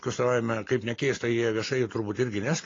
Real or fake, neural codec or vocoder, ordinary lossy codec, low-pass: real; none; AAC, 32 kbps; 7.2 kHz